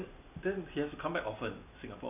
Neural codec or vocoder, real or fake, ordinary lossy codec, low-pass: none; real; none; 3.6 kHz